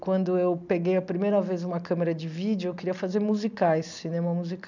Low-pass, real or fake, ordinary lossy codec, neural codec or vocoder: 7.2 kHz; real; none; none